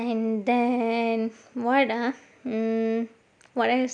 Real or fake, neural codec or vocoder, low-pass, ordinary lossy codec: real; none; 9.9 kHz; none